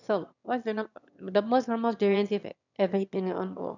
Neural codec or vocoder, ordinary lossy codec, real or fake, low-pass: autoencoder, 22.05 kHz, a latent of 192 numbers a frame, VITS, trained on one speaker; none; fake; 7.2 kHz